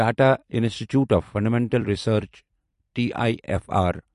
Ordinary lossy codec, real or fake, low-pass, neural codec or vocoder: MP3, 48 kbps; fake; 14.4 kHz; vocoder, 44.1 kHz, 128 mel bands every 256 samples, BigVGAN v2